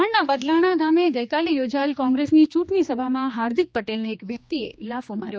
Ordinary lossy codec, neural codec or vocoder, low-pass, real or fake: none; codec, 16 kHz, 2 kbps, X-Codec, HuBERT features, trained on general audio; none; fake